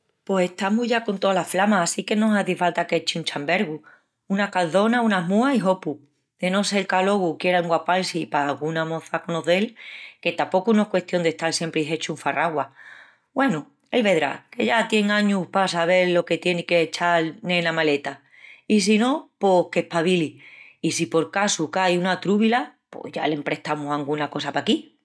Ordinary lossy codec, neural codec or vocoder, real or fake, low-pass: none; none; real; none